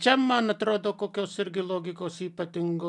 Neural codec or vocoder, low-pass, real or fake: none; 10.8 kHz; real